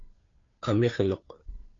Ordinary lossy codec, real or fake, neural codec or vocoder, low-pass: AAC, 32 kbps; fake; codec, 16 kHz, 2 kbps, FunCodec, trained on Chinese and English, 25 frames a second; 7.2 kHz